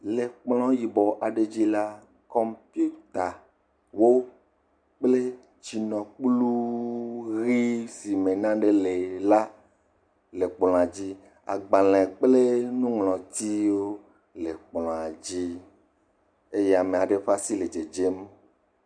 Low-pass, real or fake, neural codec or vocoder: 9.9 kHz; real; none